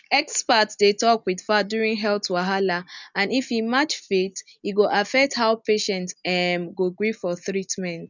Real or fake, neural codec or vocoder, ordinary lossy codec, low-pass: real; none; none; 7.2 kHz